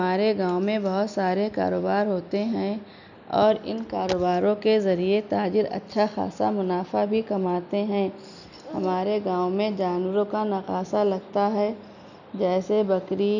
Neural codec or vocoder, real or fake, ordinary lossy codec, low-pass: none; real; none; 7.2 kHz